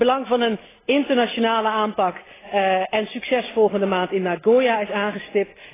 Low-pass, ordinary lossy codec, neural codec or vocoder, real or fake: 3.6 kHz; AAC, 16 kbps; none; real